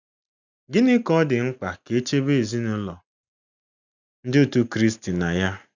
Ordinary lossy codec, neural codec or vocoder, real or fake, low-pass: none; none; real; 7.2 kHz